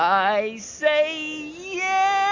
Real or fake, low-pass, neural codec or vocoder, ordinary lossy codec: real; 7.2 kHz; none; AAC, 48 kbps